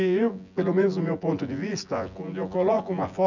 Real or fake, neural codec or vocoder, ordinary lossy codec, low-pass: fake; vocoder, 24 kHz, 100 mel bands, Vocos; none; 7.2 kHz